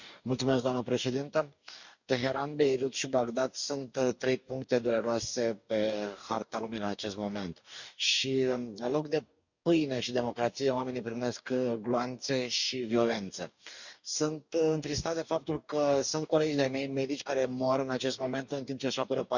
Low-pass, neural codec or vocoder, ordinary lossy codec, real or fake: 7.2 kHz; codec, 44.1 kHz, 2.6 kbps, DAC; none; fake